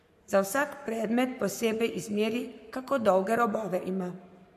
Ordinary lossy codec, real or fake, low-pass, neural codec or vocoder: MP3, 64 kbps; fake; 14.4 kHz; codec, 44.1 kHz, 7.8 kbps, Pupu-Codec